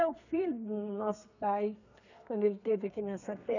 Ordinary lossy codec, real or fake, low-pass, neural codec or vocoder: none; fake; 7.2 kHz; codec, 32 kHz, 1.9 kbps, SNAC